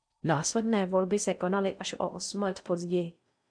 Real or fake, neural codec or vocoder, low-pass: fake; codec, 16 kHz in and 24 kHz out, 0.6 kbps, FocalCodec, streaming, 4096 codes; 9.9 kHz